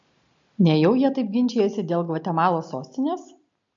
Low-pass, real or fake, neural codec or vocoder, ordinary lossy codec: 7.2 kHz; real; none; MP3, 48 kbps